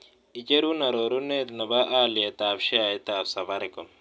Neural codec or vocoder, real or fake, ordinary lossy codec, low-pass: none; real; none; none